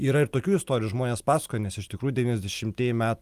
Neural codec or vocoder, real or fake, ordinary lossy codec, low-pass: none; real; Opus, 32 kbps; 14.4 kHz